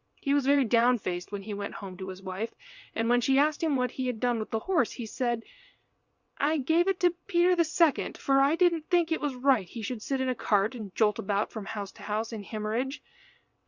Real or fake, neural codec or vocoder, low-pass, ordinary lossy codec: fake; vocoder, 22.05 kHz, 80 mel bands, WaveNeXt; 7.2 kHz; Opus, 64 kbps